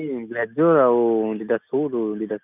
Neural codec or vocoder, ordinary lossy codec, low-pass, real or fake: none; none; 3.6 kHz; real